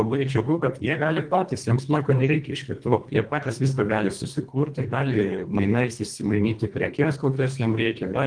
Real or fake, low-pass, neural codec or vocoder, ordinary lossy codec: fake; 9.9 kHz; codec, 24 kHz, 1.5 kbps, HILCodec; Opus, 32 kbps